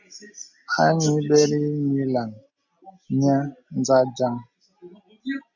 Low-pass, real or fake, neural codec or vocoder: 7.2 kHz; real; none